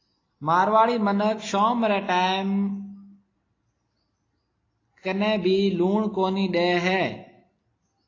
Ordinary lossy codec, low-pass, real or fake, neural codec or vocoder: AAC, 32 kbps; 7.2 kHz; real; none